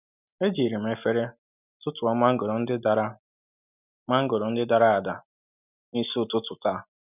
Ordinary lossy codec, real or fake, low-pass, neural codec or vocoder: none; real; 3.6 kHz; none